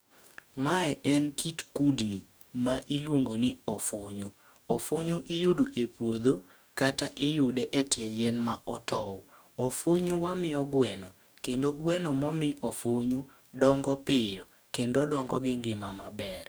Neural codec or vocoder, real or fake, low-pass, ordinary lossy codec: codec, 44.1 kHz, 2.6 kbps, DAC; fake; none; none